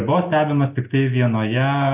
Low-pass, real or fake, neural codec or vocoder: 3.6 kHz; real; none